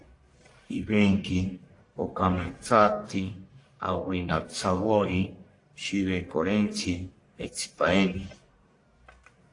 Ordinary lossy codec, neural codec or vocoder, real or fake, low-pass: AAC, 48 kbps; codec, 44.1 kHz, 1.7 kbps, Pupu-Codec; fake; 10.8 kHz